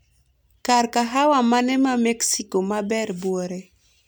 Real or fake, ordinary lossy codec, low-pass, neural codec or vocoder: real; none; none; none